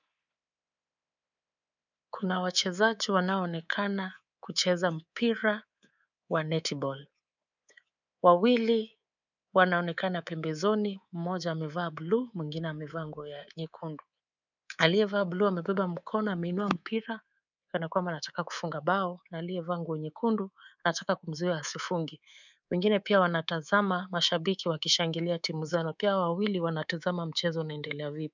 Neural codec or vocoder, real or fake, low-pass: codec, 24 kHz, 3.1 kbps, DualCodec; fake; 7.2 kHz